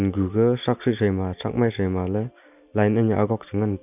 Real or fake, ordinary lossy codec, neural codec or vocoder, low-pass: real; none; none; 3.6 kHz